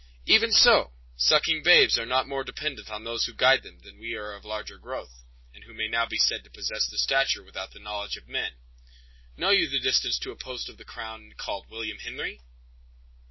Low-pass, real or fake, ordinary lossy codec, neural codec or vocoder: 7.2 kHz; real; MP3, 24 kbps; none